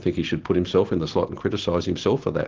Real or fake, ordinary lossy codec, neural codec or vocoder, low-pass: real; Opus, 16 kbps; none; 7.2 kHz